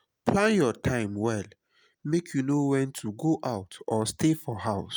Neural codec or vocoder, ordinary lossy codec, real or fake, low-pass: none; none; real; none